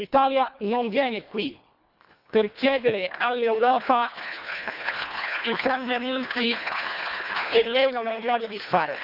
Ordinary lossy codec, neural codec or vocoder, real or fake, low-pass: Opus, 64 kbps; codec, 24 kHz, 1.5 kbps, HILCodec; fake; 5.4 kHz